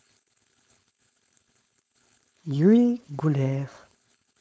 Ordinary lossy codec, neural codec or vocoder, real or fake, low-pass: none; codec, 16 kHz, 4.8 kbps, FACodec; fake; none